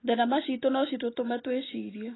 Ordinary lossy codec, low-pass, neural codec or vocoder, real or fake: AAC, 16 kbps; 7.2 kHz; none; real